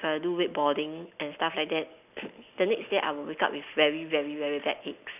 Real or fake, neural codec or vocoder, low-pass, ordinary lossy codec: real; none; 3.6 kHz; none